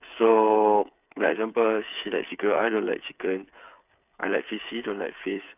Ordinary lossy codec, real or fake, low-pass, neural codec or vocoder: none; fake; 3.6 kHz; codec, 16 kHz, 8 kbps, FreqCodec, smaller model